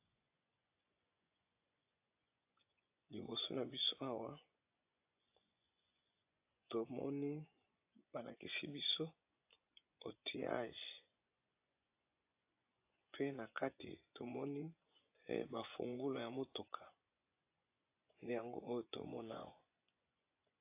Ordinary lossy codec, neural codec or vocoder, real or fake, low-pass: AAC, 24 kbps; vocoder, 24 kHz, 100 mel bands, Vocos; fake; 3.6 kHz